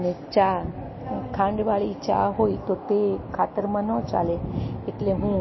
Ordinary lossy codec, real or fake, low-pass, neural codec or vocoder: MP3, 24 kbps; real; 7.2 kHz; none